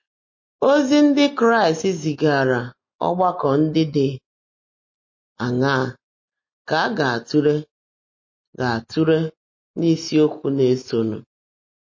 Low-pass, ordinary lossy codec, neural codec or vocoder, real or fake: 7.2 kHz; MP3, 32 kbps; none; real